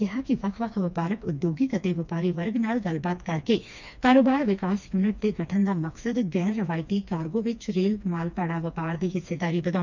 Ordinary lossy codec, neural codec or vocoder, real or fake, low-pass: none; codec, 16 kHz, 2 kbps, FreqCodec, smaller model; fake; 7.2 kHz